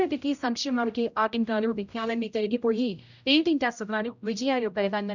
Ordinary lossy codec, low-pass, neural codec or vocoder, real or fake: none; 7.2 kHz; codec, 16 kHz, 0.5 kbps, X-Codec, HuBERT features, trained on general audio; fake